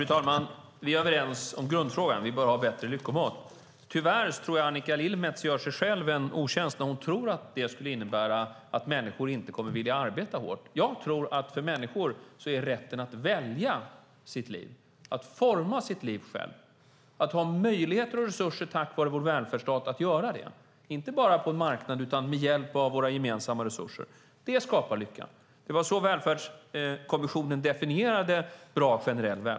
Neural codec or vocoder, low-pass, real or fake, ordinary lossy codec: none; none; real; none